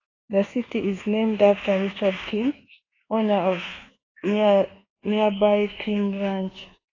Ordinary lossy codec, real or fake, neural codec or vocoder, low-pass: AAC, 32 kbps; fake; codec, 24 kHz, 1.2 kbps, DualCodec; 7.2 kHz